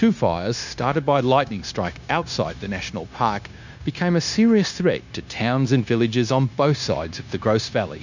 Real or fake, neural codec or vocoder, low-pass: fake; codec, 16 kHz, 0.9 kbps, LongCat-Audio-Codec; 7.2 kHz